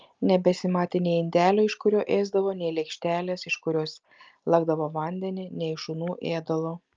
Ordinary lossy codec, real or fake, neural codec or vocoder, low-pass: Opus, 24 kbps; real; none; 7.2 kHz